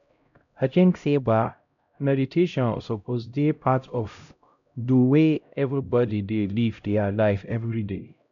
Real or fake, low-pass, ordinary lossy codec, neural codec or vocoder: fake; 7.2 kHz; none; codec, 16 kHz, 0.5 kbps, X-Codec, HuBERT features, trained on LibriSpeech